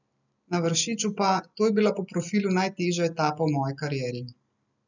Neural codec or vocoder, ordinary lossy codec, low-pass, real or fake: none; none; 7.2 kHz; real